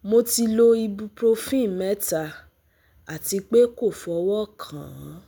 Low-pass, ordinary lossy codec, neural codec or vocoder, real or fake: none; none; none; real